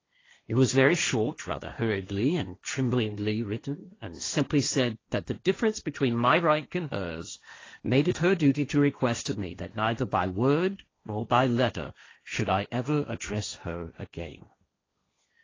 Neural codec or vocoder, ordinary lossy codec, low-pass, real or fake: codec, 16 kHz, 1.1 kbps, Voila-Tokenizer; AAC, 32 kbps; 7.2 kHz; fake